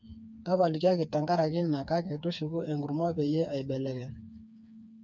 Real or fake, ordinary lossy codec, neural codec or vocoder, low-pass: fake; none; codec, 16 kHz, 4 kbps, FreqCodec, smaller model; none